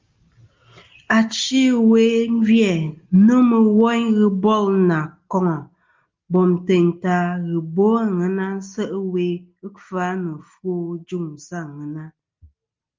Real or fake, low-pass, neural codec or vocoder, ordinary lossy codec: real; 7.2 kHz; none; Opus, 32 kbps